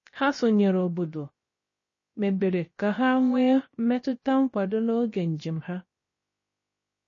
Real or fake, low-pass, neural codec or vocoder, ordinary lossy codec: fake; 7.2 kHz; codec, 16 kHz, about 1 kbps, DyCAST, with the encoder's durations; MP3, 32 kbps